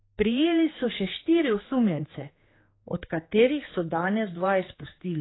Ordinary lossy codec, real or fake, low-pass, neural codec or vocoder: AAC, 16 kbps; fake; 7.2 kHz; codec, 16 kHz, 4 kbps, X-Codec, HuBERT features, trained on general audio